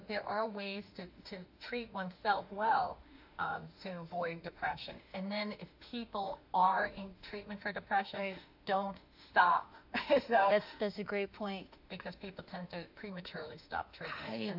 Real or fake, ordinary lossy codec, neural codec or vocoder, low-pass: fake; AAC, 48 kbps; autoencoder, 48 kHz, 32 numbers a frame, DAC-VAE, trained on Japanese speech; 5.4 kHz